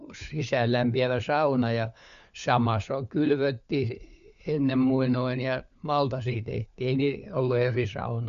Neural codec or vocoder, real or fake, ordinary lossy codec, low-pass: codec, 16 kHz, 4 kbps, FunCodec, trained on LibriTTS, 50 frames a second; fake; none; 7.2 kHz